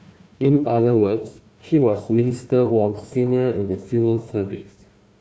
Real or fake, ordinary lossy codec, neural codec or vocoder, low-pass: fake; none; codec, 16 kHz, 1 kbps, FunCodec, trained on Chinese and English, 50 frames a second; none